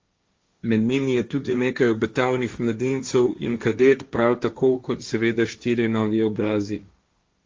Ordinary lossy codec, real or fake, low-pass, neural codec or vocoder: Opus, 32 kbps; fake; 7.2 kHz; codec, 16 kHz, 1.1 kbps, Voila-Tokenizer